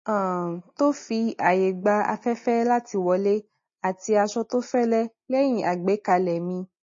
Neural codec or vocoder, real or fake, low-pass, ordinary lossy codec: none; real; 7.2 kHz; MP3, 32 kbps